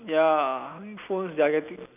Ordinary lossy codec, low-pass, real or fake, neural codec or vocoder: none; 3.6 kHz; real; none